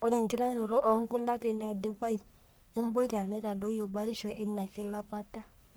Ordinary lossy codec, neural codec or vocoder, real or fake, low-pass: none; codec, 44.1 kHz, 1.7 kbps, Pupu-Codec; fake; none